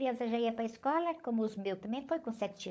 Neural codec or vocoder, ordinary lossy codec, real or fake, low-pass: codec, 16 kHz, 16 kbps, FunCodec, trained on LibriTTS, 50 frames a second; none; fake; none